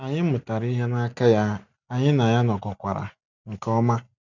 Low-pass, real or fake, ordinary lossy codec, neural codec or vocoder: 7.2 kHz; real; none; none